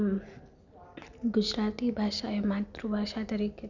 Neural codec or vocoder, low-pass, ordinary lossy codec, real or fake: none; 7.2 kHz; none; real